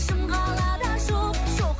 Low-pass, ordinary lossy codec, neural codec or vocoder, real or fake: none; none; none; real